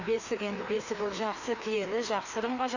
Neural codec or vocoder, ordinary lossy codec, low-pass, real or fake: codec, 16 kHz, 2 kbps, FreqCodec, larger model; none; 7.2 kHz; fake